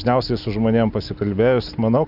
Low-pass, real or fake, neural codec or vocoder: 5.4 kHz; real; none